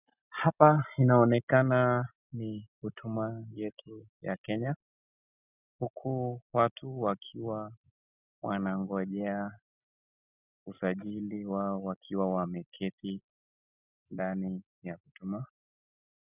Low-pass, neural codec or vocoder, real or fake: 3.6 kHz; none; real